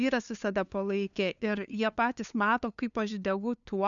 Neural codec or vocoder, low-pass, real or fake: codec, 16 kHz, 2 kbps, FunCodec, trained on LibriTTS, 25 frames a second; 7.2 kHz; fake